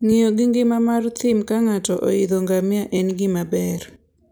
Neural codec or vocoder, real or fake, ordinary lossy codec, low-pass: none; real; none; none